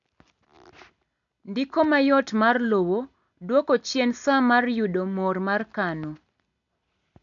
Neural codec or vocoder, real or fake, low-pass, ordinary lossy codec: none; real; 7.2 kHz; none